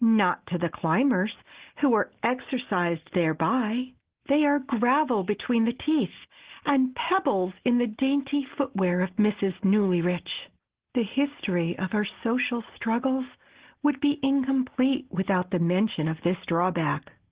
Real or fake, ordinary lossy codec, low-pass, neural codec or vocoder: real; Opus, 16 kbps; 3.6 kHz; none